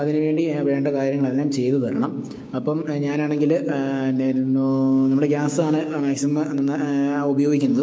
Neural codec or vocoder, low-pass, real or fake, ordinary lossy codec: codec, 16 kHz, 6 kbps, DAC; none; fake; none